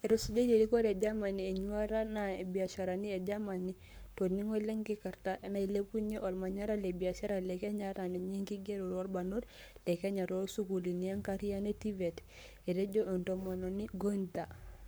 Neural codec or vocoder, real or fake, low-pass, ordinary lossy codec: codec, 44.1 kHz, 7.8 kbps, Pupu-Codec; fake; none; none